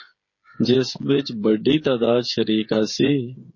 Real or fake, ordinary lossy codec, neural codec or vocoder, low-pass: fake; MP3, 32 kbps; codec, 16 kHz, 8 kbps, FreqCodec, smaller model; 7.2 kHz